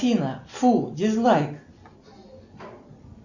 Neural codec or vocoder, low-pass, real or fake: none; 7.2 kHz; real